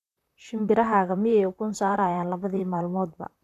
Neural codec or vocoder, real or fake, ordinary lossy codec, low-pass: vocoder, 44.1 kHz, 128 mel bands, Pupu-Vocoder; fake; none; 14.4 kHz